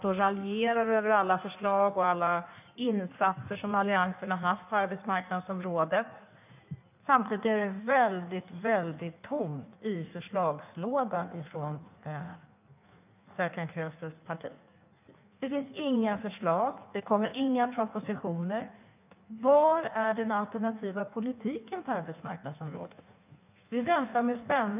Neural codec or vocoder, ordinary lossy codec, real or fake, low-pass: codec, 16 kHz in and 24 kHz out, 1.1 kbps, FireRedTTS-2 codec; none; fake; 3.6 kHz